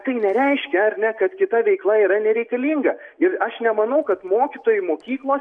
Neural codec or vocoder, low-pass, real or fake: none; 9.9 kHz; real